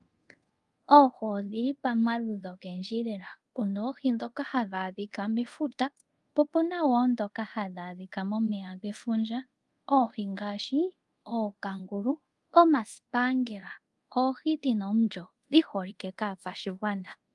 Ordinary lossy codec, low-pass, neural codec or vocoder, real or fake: Opus, 32 kbps; 10.8 kHz; codec, 24 kHz, 0.5 kbps, DualCodec; fake